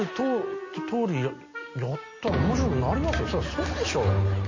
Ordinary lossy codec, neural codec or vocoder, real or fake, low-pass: MP3, 32 kbps; none; real; 7.2 kHz